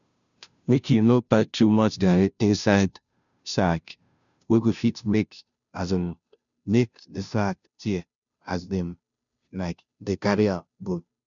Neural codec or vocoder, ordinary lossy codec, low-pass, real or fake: codec, 16 kHz, 0.5 kbps, FunCodec, trained on Chinese and English, 25 frames a second; none; 7.2 kHz; fake